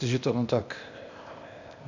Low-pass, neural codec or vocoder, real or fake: 7.2 kHz; codec, 16 kHz, 0.8 kbps, ZipCodec; fake